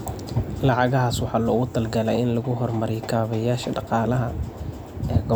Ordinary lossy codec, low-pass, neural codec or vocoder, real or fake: none; none; none; real